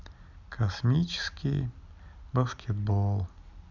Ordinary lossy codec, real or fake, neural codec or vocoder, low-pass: none; real; none; 7.2 kHz